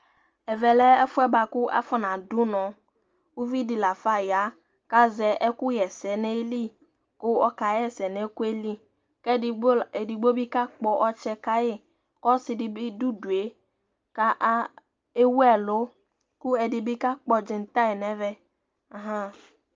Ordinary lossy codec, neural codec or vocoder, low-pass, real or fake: Opus, 24 kbps; none; 7.2 kHz; real